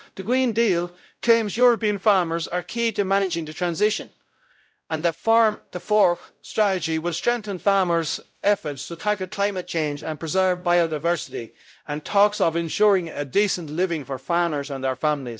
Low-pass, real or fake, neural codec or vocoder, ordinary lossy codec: none; fake; codec, 16 kHz, 0.5 kbps, X-Codec, WavLM features, trained on Multilingual LibriSpeech; none